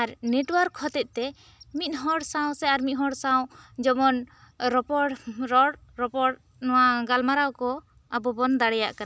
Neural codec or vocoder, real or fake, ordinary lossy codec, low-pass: none; real; none; none